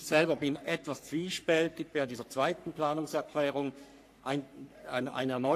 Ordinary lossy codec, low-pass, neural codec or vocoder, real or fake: AAC, 64 kbps; 14.4 kHz; codec, 44.1 kHz, 3.4 kbps, Pupu-Codec; fake